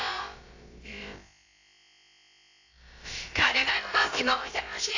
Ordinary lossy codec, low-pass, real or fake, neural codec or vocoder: AAC, 48 kbps; 7.2 kHz; fake; codec, 16 kHz, about 1 kbps, DyCAST, with the encoder's durations